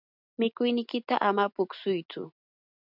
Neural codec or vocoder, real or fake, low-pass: none; real; 5.4 kHz